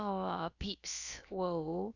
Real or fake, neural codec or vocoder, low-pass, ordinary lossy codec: fake; codec, 16 kHz, about 1 kbps, DyCAST, with the encoder's durations; 7.2 kHz; none